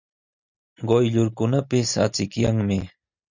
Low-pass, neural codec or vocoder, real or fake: 7.2 kHz; none; real